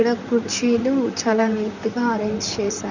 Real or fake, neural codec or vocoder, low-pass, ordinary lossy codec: fake; vocoder, 44.1 kHz, 128 mel bands, Pupu-Vocoder; 7.2 kHz; none